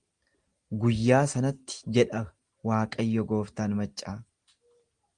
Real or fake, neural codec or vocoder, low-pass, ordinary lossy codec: real; none; 9.9 kHz; Opus, 24 kbps